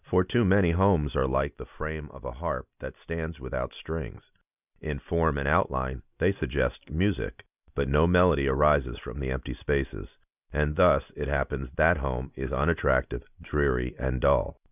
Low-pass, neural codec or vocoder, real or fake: 3.6 kHz; none; real